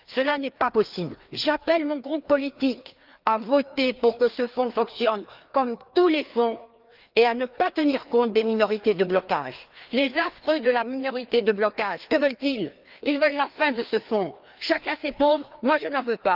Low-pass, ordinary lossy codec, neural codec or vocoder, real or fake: 5.4 kHz; Opus, 24 kbps; codec, 16 kHz, 2 kbps, FreqCodec, larger model; fake